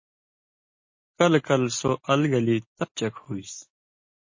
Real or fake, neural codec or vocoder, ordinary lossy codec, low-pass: real; none; MP3, 32 kbps; 7.2 kHz